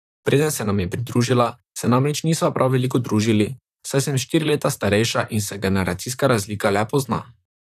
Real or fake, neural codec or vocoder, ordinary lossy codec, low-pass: fake; vocoder, 44.1 kHz, 128 mel bands, Pupu-Vocoder; none; 14.4 kHz